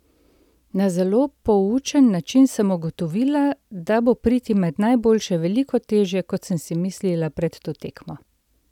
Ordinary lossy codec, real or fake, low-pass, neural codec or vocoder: none; real; 19.8 kHz; none